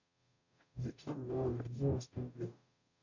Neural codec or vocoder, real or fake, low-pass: codec, 44.1 kHz, 0.9 kbps, DAC; fake; 7.2 kHz